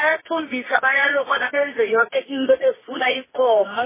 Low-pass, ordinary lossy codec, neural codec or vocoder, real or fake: 3.6 kHz; MP3, 16 kbps; codec, 24 kHz, 0.9 kbps, WavTokenizer, medium music audio release; fake